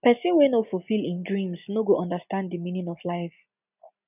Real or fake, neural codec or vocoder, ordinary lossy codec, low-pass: real; none; none; 3.6 kHz